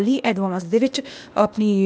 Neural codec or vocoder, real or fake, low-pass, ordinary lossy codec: codec, 16 kHz, 0.8 kbps, ZipCodec; fake; none; none